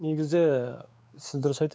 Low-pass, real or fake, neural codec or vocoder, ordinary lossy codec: none; fake; codec, 16 kHz, 4 kbps, X-Codec, WavLM features, trained on Multilingual LibriSpeech; none